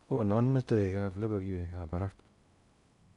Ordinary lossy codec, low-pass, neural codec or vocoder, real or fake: none; 10.8 kHz; codec, 16 kHz in and 24 kHz out, 0.6 kbps, FocalCodec, streaming, 2048 codes; fake